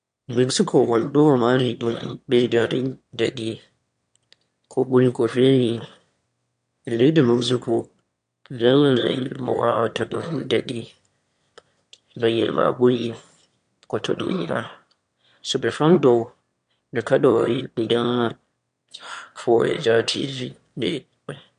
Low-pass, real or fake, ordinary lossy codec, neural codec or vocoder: 9.9 kHz; fake; MP3, 64 kbps; autoencoder, 22.05 kHz, a latent of 192 numbers a frame, VITS, trained on one speaker